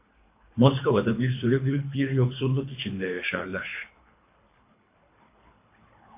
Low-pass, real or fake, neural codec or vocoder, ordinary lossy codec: 3.6 kHz; fake; codec, 24 kHz, 3 kbps, HILCodec; MP3, 24 kbps